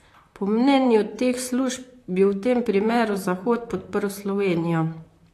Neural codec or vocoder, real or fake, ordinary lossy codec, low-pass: vocoder, 44.1 kHz, 128 mel bands, Pupu-Vocoder; fake; AAC, 64 kbps; 14.4 kHz